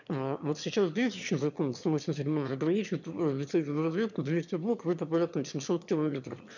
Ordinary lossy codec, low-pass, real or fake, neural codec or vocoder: none; 7.2 kHz; fake; autoencoder, 22.05 kHz, a latent of 192 numbers a frame, VITS, trained on one speaker